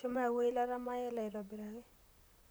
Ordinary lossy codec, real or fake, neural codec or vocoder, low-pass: none; fake; vocoder, 44.1 kHz, 128 mel bands, Pupu-Vocoder; none